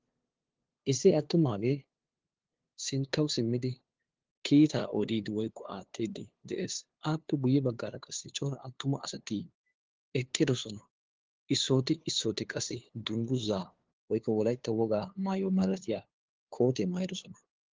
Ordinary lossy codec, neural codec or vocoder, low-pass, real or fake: Opus, 16 kbps; codec, 16 kHz, 2 kbps, FunCodec, trained on LibriTTS, 25 frames a second; 7.2 kHz; fake